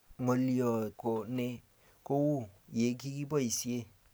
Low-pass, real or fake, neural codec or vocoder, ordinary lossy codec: none; real; none; none